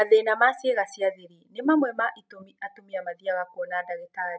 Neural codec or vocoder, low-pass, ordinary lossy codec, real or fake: none; none; none; real